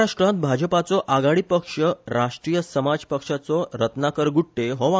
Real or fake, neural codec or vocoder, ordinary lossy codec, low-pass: real; none; none; none